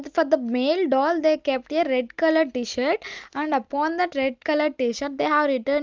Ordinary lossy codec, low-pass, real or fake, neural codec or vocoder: Opus, 32 kbps; 7.2 kHz; real; none